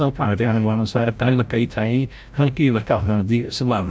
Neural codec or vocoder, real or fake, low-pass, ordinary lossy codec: codec, 16 kHz, 0.5 kbps, FreqCodec, larger model; fake; none; none